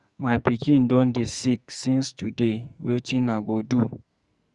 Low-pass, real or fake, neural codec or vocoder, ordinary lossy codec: 10.8 kHz; fake; codec, 44.1 kHz, 2.6 kbps, SNAC; Opus, 64 kbps